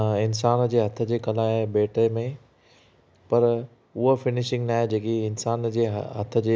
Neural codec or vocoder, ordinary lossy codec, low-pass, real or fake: none; none; none; real